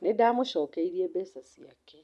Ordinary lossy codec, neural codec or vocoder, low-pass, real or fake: none; none; none; real